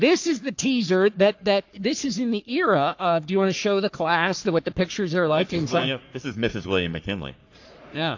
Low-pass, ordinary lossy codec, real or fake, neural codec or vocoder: 7.2 kHz; AAC, 48 kbps; fake; codec, 44.1 kHz, 3.4 kbps, Pupu-Codec